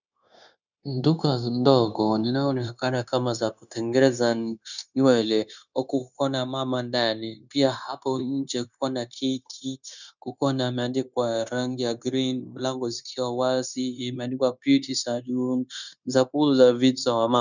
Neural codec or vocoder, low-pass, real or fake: codec, 16 kHz, 0.9 kbps, LongCat-Audio-Codec; 7.2 kHz; fake